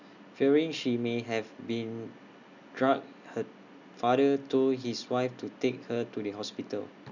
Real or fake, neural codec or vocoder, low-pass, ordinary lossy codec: real; none; 7.2 kHz; none